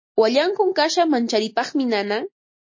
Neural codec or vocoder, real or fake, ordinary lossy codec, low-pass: none; real; MP3, 32 kbps; 7.2 kHz